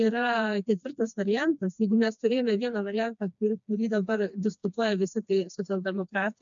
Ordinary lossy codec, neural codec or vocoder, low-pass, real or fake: MP3, 64 kbps; codec, 16 kHz, 2 kbps, FreqCodec, smaller model; 7.2 kHz; fake